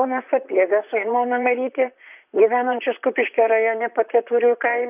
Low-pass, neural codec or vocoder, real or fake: 3.6 kHz; vocoder, 44.1 kHz, 128 mel bands, Pupu-Vocoder; fake